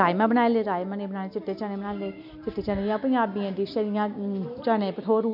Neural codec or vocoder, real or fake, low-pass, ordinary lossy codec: none; real; 5.4 kHz; none